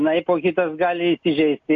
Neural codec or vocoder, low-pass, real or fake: none; 7.2 kHz; real